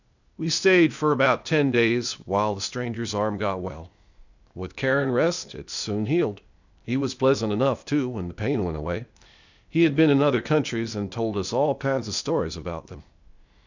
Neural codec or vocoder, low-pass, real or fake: codec, 16 kHz, 0.8 kbps, ZipCodec; 7.2 kHz; fake